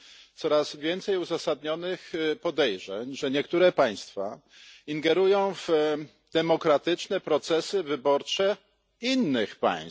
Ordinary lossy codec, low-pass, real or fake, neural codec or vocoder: none; none; real; none